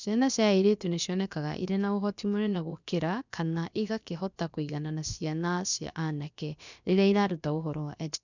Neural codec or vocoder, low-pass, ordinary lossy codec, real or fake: codec, 16 kHz, about 1 kbps, DyCAST, with the encoder's durations; 7.2 kHz; none; fake